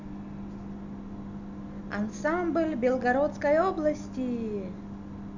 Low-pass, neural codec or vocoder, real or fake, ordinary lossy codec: 7.2 kHz; none; real; none